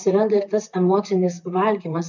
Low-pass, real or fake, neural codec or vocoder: 7.2 kHz; fake; vocoder, 44.1 kHz, 128 mel bands, Pupu-Vocoder